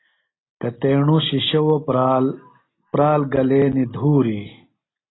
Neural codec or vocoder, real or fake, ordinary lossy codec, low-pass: none; real; AAC, 16 kbps; 7.2 kHz